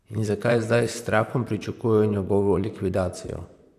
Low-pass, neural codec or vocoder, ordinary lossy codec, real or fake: 14.4 kHz; vocoder, 44.1 kHz, 128 mel bands, Pupu-Vocoder; none; fake